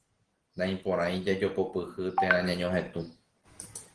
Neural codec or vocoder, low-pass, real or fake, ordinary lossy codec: none; 9.9 kHz; real; Opus, 16 kbps